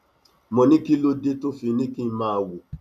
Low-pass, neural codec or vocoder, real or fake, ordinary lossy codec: 14.4 kHz; none; real; none